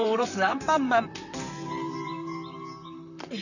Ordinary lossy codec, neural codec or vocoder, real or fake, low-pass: none; vocoder, 44.1 kHz, 128 mel bands, Pupu-Vocoder; fake; 7.2 kHz